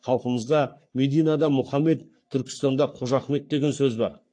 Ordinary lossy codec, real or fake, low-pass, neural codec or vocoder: AAC, 48 kbps; fake; 9.9 kHz; codec, 44.1 kHz, 3.4 kbps, Pupu-Codec